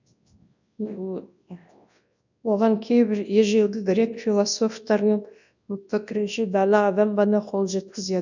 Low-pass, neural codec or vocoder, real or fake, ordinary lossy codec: 7.2 kHz; codec, 24 kHz, 0.9 kbps, WavTokenizer, large speech release; fake; none